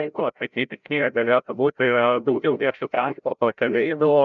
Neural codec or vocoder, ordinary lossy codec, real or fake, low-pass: codec, 16 kHz, 0.5 kbps, FreqCodec, larger model; MP3, 96 kbps; fake; 7.2 kHz